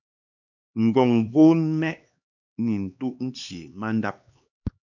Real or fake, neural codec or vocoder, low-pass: fake; codec, 16 kHz, 2 kbps, X-Codec, HuBERT features, trained on LibriSpeech; 7.2 kHz